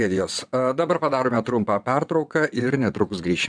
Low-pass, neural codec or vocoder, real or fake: 9.9 kHz; vocoder, 22.05 kHz, 80 mel bands, WaveNeXt; fake